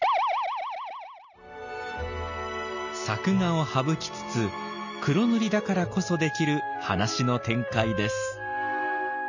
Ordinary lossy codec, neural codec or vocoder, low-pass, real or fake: none; none; 7.2 kHz; real